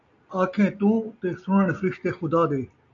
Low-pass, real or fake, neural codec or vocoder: 7.2 kHz; real; none